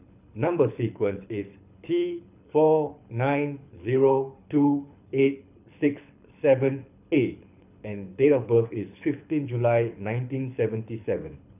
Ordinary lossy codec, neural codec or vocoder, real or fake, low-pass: none; codec, 24 kHz, 6 kbps, HILCodec; fake; 3.6 kHz